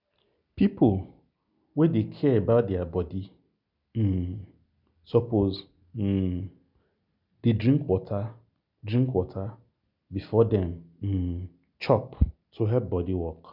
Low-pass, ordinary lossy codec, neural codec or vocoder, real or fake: 5.4 kHz; none; none; real